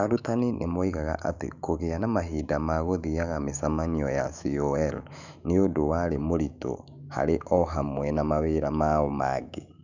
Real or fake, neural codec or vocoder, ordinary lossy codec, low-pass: fake; autoencoder, 48 kHz, 128 numbers a frame, DAC-VAE, trained on Japanese speech; none; 7.2 kHz